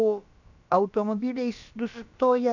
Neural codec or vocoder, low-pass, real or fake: codec, 16 kHz, about 1 kbps, DyCAST, with the encoder's durations; 7.2 kHz; fake